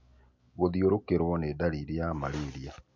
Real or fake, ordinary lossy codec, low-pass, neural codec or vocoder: real; MP3, 48 kbps; 7.2 kHz; none